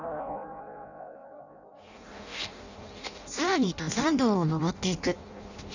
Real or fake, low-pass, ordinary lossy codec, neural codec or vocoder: fake; 7.2 kHz; none; codec, 16 kHz in and 24 kHz out, 0.6 kbps, FireRedTTS-2 codec